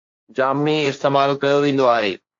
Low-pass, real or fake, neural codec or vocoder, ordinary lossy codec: 7.2 kHz; fake; codec, 16 kHz, 1.1 kbps, Voila-Tokenizer; AAC, 64 kbps